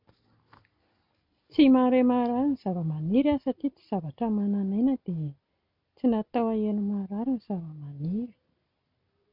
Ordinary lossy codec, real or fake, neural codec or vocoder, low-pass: none; real; none; 5.4 kHz